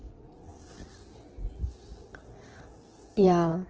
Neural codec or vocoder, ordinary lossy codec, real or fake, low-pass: codec, 16 kHz in and 24 kHz out, 2.2 kbps, FireRedTTS-2 codec; Opus, 16 kbps; fake; 7.2 kHz